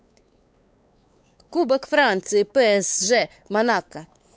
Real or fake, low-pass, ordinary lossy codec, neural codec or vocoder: fake; none; none; codec, 16 kHz, 4 kbps, X-Codec, WavLM features, trained on Multilingual LibriSpeech